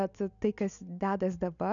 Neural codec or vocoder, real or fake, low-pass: none; real; 7.2 kHz